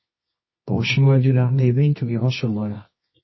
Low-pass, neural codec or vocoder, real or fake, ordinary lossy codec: 7.2 kHz; codec, 24 kHz, 0.9 kbps, WavTokenizer, medium music audio release; fake; MP3, 24 kbps